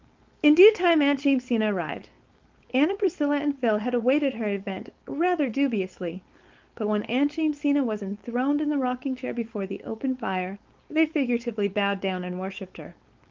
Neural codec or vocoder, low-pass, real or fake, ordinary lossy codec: codec, 16 kHz, 4.8 kbps, FACodec; 7.2 kHz; fake; Opus, 32 kbps